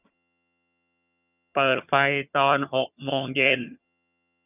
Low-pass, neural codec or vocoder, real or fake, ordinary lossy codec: 3.6 kHz; vocoder, 22.05 kHz, 80 mel bands, HiFi-GAN; fake; none